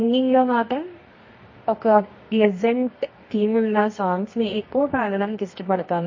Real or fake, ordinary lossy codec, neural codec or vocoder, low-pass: fake; MP3, 32 kbps; codec, 24 kHz, 0.9 kbps, WavTokenizer, medium music audio release; 7.2 kHz